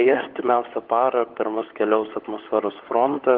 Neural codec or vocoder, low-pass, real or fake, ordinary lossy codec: codec, 16 kHz, 16 kbps, FunCodec, trained on LibriTTS, 50 frames a second; 5.4 kHz; fake; Opus, 16 kbps